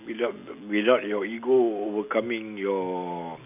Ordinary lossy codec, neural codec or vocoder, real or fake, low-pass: none; none; real; 3.6 kHz